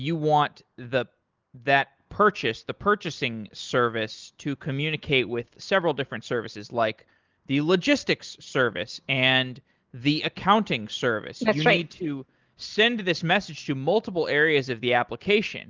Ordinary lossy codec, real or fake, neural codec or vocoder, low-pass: Opus, 32 kbps; real; none; 7.2 kHz